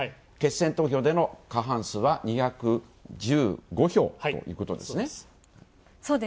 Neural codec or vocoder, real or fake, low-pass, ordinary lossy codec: none; real; none; none